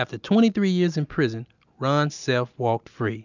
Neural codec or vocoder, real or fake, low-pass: none; real; 7.2 kHz